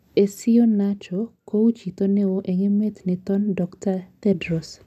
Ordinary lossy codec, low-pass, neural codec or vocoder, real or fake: none; 14.4 kHz; none; real